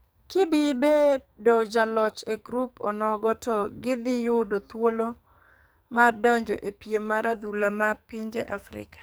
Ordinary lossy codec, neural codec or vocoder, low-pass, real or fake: none; codec, 44.1 kHz, 2.6 kbps, SNAC; none; fake